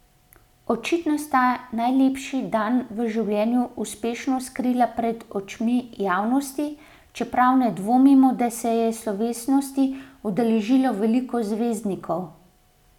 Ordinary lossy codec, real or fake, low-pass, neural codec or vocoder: none; real; 19.8 kHz; none